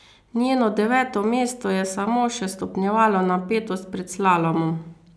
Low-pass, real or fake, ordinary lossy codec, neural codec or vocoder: none; real; none; none